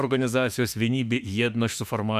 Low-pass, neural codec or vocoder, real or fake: 14.4 kHz; autoencoder, 48 kHz, 32 numbers a frame, DAC-VAE, trained on Japanese speech; fake